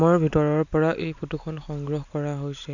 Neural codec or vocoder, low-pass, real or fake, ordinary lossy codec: none; 7.2 kHz; real; none